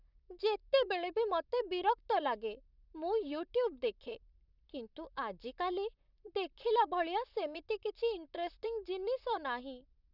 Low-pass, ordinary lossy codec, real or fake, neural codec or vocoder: 5.4 kHz; none; fake; vocoder, 44.1 kHz, 128 mel bands, Pupu-Vocoder